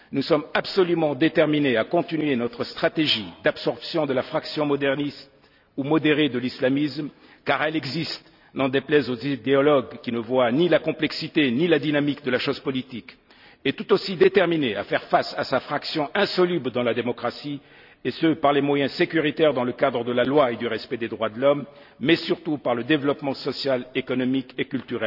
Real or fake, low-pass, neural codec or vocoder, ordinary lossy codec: real; 5.4 kHz; none; none